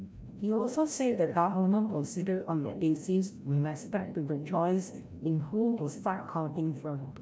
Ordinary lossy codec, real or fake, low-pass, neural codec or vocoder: none; fake; none; codec, 16 kHz, 0.5 kbps, FreqCodec, larger model